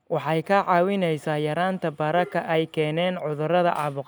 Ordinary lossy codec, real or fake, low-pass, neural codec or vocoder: none; real; none; none